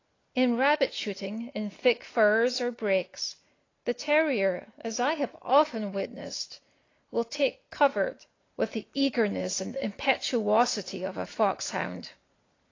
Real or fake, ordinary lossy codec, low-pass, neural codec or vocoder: real; AAC, 32 kbps; 7.2 kHz; none